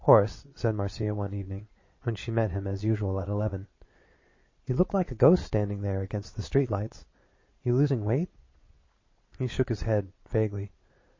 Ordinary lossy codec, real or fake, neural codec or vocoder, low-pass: MP3, 32 kbps; fake; vocoder, 22.05 kHz, 80 mel bands, Vocos; 7.2 kHz